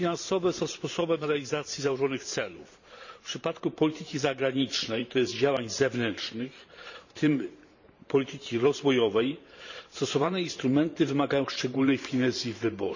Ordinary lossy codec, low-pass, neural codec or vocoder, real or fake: none; 7.2 kHz; vocoder, 44.1 kHz, 128 mel bands every 512 samples, BigVGAN v2; fake